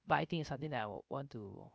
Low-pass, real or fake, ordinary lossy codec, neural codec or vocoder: none; fake; none; codec, 16 kHz, 0.3 kbps, FocalCodec